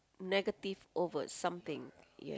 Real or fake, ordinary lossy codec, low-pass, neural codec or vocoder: real; none; none; none